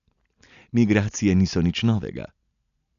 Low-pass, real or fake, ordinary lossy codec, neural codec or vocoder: 7.2 kHz; real; none; none